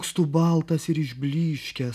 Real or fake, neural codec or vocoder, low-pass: real; none; 14.4 kHz